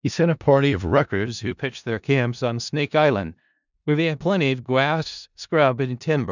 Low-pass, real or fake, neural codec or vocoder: 7.2 kHz; fake; codec, 16 kHz in and 24 kHz out, 0.4 kbps, LongCat-Audio-Codec, four codebook decoder